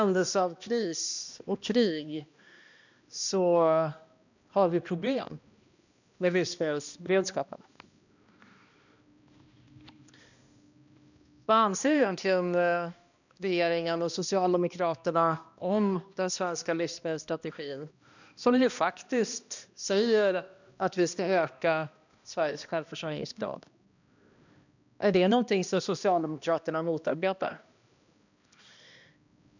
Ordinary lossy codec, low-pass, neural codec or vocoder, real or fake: none; 7.2 kHz; codec, 16 kHz, 1 kbps, X-Codec, HuBERT features, trained on balanced general audio; fake